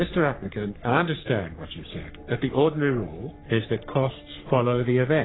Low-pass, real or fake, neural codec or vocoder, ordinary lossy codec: 7.2 kHz; fake; codec, 44.1 kHz, 3.4 kbps, Pupu-Codec; AAC, 16 kbps